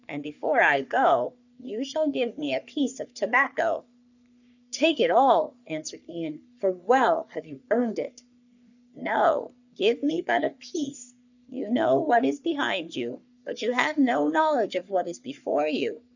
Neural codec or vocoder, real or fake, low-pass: codec, 44.1 kHz, 3.4 kbps, Pupu-Codec; fake; 7.2 kHz